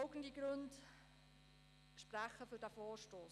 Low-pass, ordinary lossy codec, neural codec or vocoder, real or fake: none; none; none; real